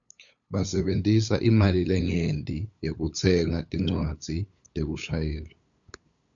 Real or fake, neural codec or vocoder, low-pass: fake; codec, 16 kHz, 8 kbps, FunCodec, trained on LibriTTS, 25 frames a second; 7.2 kHz